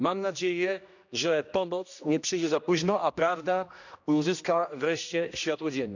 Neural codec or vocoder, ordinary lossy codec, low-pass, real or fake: codec, 16 kHz, 1 kbps, X-Codec, HuBERT features, trained on general audio; none; 7.2 kHz; fake